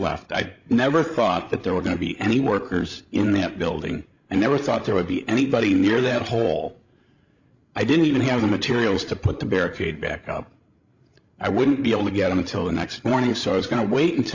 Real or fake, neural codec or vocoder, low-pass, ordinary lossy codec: fake; codec, 16 kHz, 16 kbps, FreqCodec, larger model; 7.2 kHz; Opus, 64 kbps